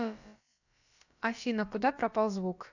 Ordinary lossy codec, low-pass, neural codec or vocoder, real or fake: none; 7.2 kHz; codec, 16 kHz, about 1 kbps, DyCAST, with the encoder's durations; fake